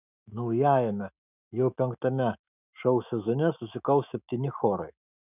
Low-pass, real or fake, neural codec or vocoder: 3.6 kHz; fake; autoencoder, 48 kHz, 128 numbers a frame, DAC-VAE, trained on Japanese speech